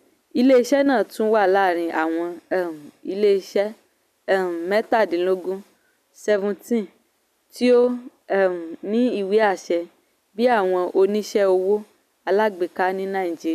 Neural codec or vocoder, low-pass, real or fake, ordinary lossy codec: none; 14.4 kHz; real; none